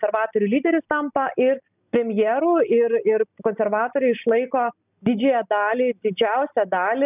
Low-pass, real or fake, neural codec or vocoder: 3.6 kHz; real; none